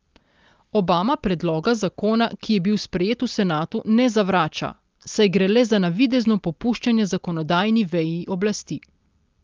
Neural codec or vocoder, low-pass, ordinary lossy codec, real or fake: none; 7.2 kHz; Opus, 32 kbps; real